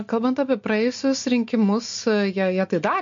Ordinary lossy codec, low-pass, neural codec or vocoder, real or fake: MP3, 48 kbps; 7.2 kHz; none; real